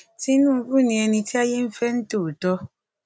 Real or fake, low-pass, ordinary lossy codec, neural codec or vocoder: real; none; none; none